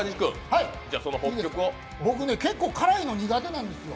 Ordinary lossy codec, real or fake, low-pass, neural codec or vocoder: none; real; none; none